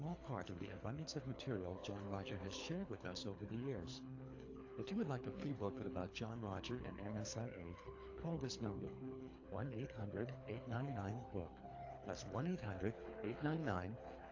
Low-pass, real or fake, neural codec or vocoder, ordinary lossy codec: 7.2 kHz; fake; codec, 24 kHz, 3 kbps, HILCodec; AAC, 48 kbps